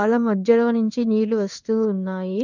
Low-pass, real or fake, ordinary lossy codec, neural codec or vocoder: 7.2 kHz; fake; none; codec, 16 kHz in and 24 kHz out, 1 kbps, XY-Tokenizer